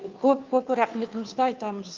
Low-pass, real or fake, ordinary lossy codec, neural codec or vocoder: 7.2 kHz; fake; Opus, 24 kbps; codec, 16 kHz, 2 kbps, FunCodec, trained on Chinese and English, 25 frames a second